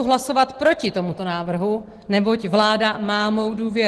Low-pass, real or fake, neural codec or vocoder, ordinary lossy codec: 10.8 kHz; real; none; Opus, 16 kbps